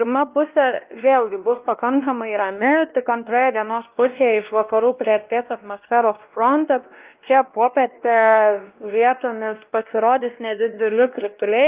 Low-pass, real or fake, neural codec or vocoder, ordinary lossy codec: 3.6 kHz; fake; codec, 16 kHz, 1 kbps, X-Codec, WavLM features, trained on Multilingual LibriSpeech; Opus, 32 kbps